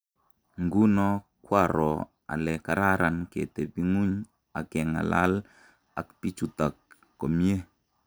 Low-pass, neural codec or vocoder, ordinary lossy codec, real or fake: none; none; none; real